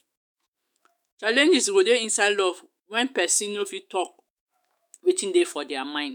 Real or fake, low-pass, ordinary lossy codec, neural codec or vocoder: fake; none; none; autoencoder, 48 kHz, 128 numbers a frame, DAC-VAE, trained on Japanese speech